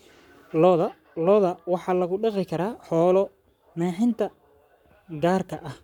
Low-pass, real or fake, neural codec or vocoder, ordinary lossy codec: 19.8 kHz; fake; codec, 44.1 kHz, 7.8 kbps, Pupu-Codec; none